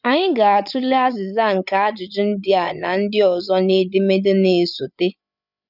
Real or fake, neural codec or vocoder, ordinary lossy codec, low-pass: fake; codec, 16 kHz, 8 kbps, FreqCodec, larger model; none; 5.4 kHz